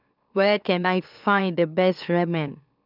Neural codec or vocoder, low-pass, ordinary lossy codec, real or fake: autoencoder, 44.1 kHz, a latent of 192 numbers a frame, MeloTTS; 5.4 kHz; none; fake